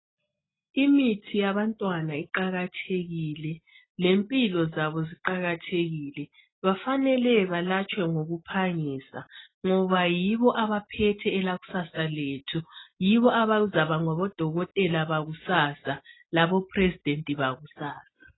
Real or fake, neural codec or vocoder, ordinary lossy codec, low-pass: real; none; AAC, 16 kbps; 7.2 kHz